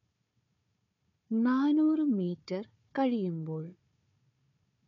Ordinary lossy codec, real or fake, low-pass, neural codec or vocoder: none; fake; 7.2 kHz; codec, 16 kHz, 4 kbps, FunCodec, trained on Chinese and English, 50 frames a second